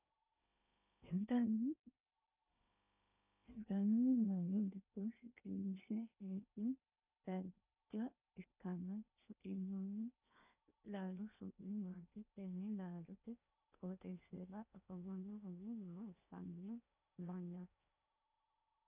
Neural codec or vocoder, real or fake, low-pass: codec, 16 kHz in and 24 kHz out, 0.8 kbps, FocalCodec, streaming, 65536 codes; fake; 3.6 kHz